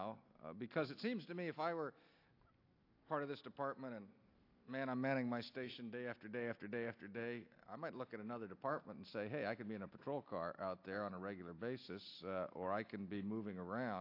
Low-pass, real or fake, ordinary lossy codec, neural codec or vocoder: 5.4 kHz; real; AAC, 32 kbps; none